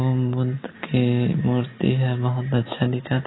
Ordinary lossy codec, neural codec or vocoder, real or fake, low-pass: AAC, 16 kbps; none; real; 7.2 kHz